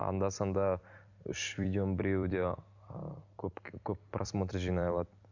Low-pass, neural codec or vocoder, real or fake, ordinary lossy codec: 7.2 kHz; vocoder, 44.1 kHz, 128 mel bands every 256 samples, BigVGAN v2; fake; none